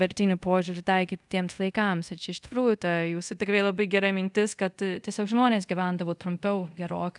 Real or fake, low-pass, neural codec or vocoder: fake; 10.8 kHz; codec, 24 kHz, 0.5 kbps, DualCodec